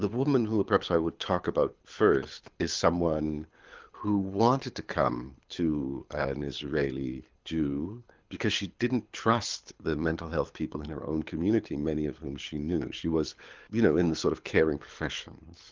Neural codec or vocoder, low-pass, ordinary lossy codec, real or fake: codec, 24 kHz, 6 kbps, HILCodec; 7.2 kHz; Opus, 32 kbps; fake